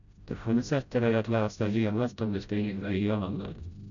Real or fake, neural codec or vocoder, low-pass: fake; codec, 16 kHz, 0.5 kbps, FreqCodec, smaller model; 7.2 kHz